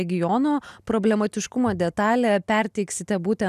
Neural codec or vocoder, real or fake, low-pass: none; real; 14.4 kHz